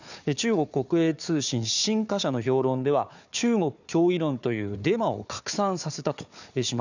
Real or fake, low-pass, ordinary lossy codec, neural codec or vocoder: fake; 7.2 kHz; none; codec, 16 kHz, 4 kbps, FunCodec, trained on Chinese and English, 50 frames a second